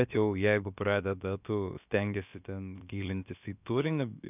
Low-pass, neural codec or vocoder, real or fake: 3.6 kHz; codec, 16 kHz, about 1 kbps, DyCAST, with the encoder's durations; fake